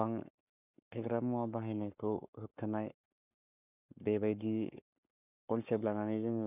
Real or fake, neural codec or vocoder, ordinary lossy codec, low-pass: fake; codec, 44.1 kHz, 7.8 kbps, Pupu-Codec; none; 3.6 kHz